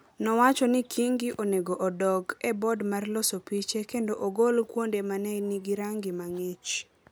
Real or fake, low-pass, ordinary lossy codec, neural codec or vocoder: real; none; none; none